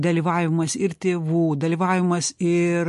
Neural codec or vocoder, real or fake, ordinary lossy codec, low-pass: none; real; MP3, 48 kbps; 14.4 kHz